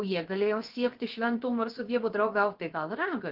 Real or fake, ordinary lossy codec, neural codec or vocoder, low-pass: fake; Opus, 16 kbps; codec, 16 kHz, 0.7 kbps, FocalCodec; 5.4 kHz